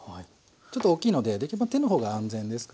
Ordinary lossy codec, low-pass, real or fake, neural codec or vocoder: none; none; real; none